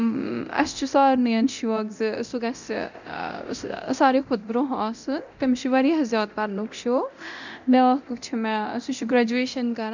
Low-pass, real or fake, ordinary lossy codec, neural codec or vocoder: 7.2 kHz; fake; none; codec, 24 kHz, 0.9 kbps, DualCodec